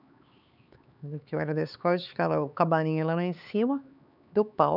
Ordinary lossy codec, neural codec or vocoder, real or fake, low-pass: none; codec, 16 kHz, 4 kbps, X-Codec, HuBERT features, trained on LibriSpeech; fake; 5.4 kHz